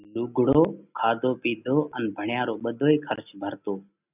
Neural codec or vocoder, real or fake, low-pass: none; real; 3.6 kHz